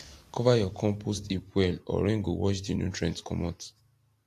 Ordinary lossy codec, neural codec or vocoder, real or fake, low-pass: AAC, 64 kbps; none; real; 14.4 kHz